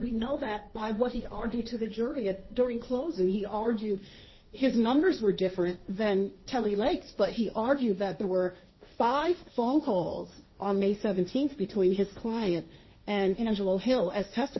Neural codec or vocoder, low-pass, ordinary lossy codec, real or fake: codec, 16 kHz, 1.1 kbps, Voila-Tokenizer; 7.2 kHz; MP3, 24 kbps; fake